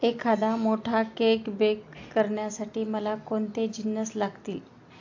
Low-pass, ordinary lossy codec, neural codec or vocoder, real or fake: 7.2 kHz; AAC, 48 kbps; none; real